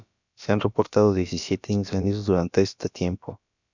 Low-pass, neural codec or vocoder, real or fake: 7.2 kHz; codec, 16 kHz, about 1 kbps, DyCAST, with the encoder's durations; fake